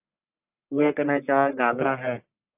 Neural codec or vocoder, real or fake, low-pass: codec, 44.1 kHz, 1.7 kbps, Pupu-Codec; fake; 3.6 kHz